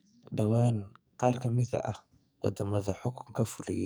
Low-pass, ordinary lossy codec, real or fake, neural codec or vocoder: none; none; fake; codec, 44.1 kHz, 2.6 kbps, SNAC